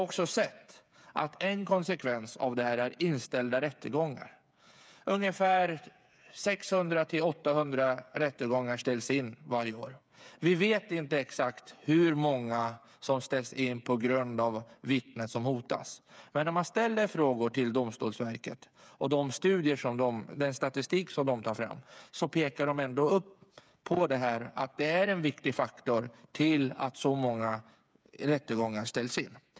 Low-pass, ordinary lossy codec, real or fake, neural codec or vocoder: none; none; fake; codec, 16 kHz, 8 kbps, FreqCodec, smaller model